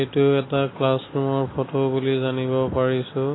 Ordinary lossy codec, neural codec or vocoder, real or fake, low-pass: AAC, 16 kbps; none; real; 7.2 kHz